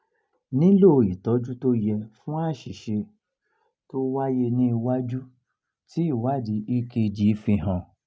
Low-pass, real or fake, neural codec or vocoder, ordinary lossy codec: none; real; none; none